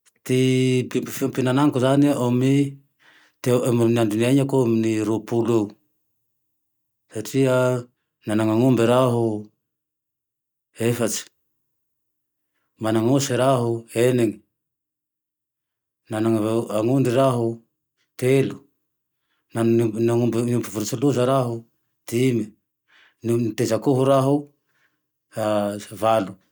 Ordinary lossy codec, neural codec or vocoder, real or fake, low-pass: none; none; real; none